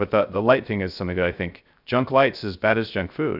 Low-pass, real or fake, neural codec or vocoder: 5.4 kHz; fake; codec, 16 kHz, 0.3 kbps, FocalCodec